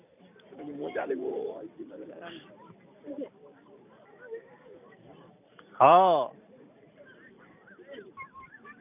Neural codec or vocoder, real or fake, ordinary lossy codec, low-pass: vocoder, 44.1 kHz, 128 mel bands every 512 samples, BigVGAN v2; fake; none; 3.6 kHz